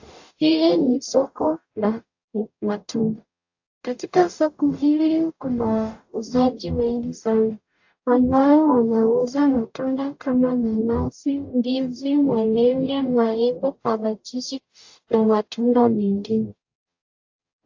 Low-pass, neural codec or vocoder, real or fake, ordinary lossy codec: 7.2 kHz; codec, 44.1 kHz, 0.9 kbps, DAC; fake; AAC, 48 kbps